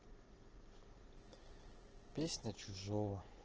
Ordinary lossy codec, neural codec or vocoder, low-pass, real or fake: Opus, 16 kbps; none; 7.2 kHz; real